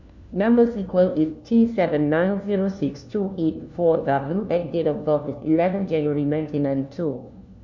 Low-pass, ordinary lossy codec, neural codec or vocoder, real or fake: 7.2 kHz; none; codec, 16 kHz, 1 kbps, FunCodec, trained on LibriTTS, 50 frames a second; fake